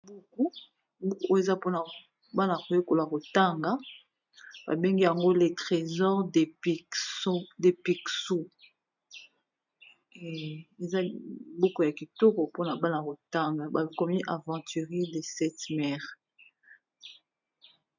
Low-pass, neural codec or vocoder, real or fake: 7.2 kHz; none; real